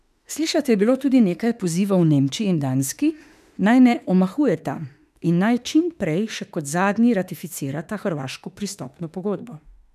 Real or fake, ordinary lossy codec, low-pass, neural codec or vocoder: fake; none; 14.4 kHz; autoencoder, 48 kHz, 32 numbers a frame, DAC-VAE, trained on Japanese speech